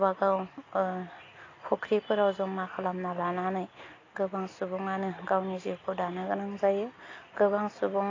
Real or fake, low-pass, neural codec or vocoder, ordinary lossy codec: real; 7.2 kHz; none; AAC, 32 kbps